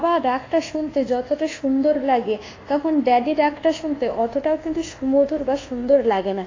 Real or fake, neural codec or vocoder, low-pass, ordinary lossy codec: fake; codec, 24 kHz, 1.2 kbps, DualCodec; 7.2 kHz; AAC, 32 kbps